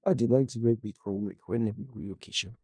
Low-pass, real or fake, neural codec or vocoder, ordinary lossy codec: 9.9 kHz; fake; codec, 16 kHz in and 24 kHz out, 0.4 kbps, LongCat-Audio-Codec, four codebook decoder; none